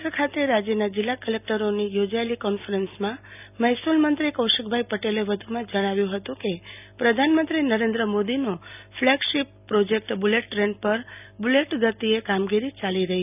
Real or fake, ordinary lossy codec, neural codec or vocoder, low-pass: real; none; none; 3.6 kHz